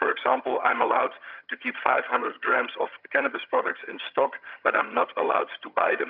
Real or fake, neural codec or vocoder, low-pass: fake; vocoder, 22.05 kHz, 80 mel bands, HiFi-GAN; 5.4 kHz